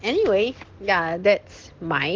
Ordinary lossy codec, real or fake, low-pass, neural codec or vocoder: Opus, 16 kbps; real; 7.2 kHz; none